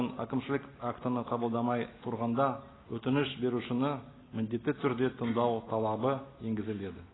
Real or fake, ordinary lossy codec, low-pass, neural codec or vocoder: real; AAC, 16 kbps; 7.2 kHz; none